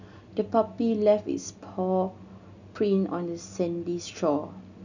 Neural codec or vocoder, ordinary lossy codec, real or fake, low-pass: none; none; real; 7.2 kHz